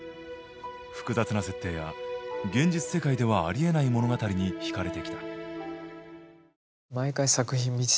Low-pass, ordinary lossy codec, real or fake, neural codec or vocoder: none; none; real; none